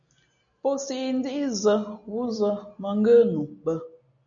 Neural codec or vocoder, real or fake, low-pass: none; real; 7.2 kHz